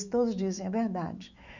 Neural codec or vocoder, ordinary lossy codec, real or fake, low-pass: autoencoder, 48 kHz, 128 numbers a frame, DAC-VAE, trained on Japanese speech; none; fake; 7.2 kHz